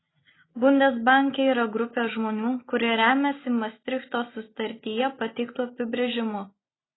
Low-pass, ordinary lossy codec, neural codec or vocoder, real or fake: 7.2 kHz; AAC, 16 kbps; none; real